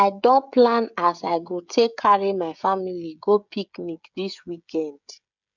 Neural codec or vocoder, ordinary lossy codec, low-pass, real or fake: codec, 16 kHz, 16 kbps, FreqCodec, smaller model; none; 7.2 kHz; fake